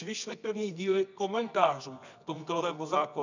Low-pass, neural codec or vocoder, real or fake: 7.2 kHz; codec, 24 kHz, 0.9 kbps, WavTokenizer, medium music audio release; fake